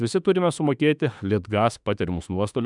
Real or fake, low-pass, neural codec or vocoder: fake; 10.8 kHz; autoencoder, 48 kHz, 32 numbers a frame, DAC-VAE, trained on Japanese speech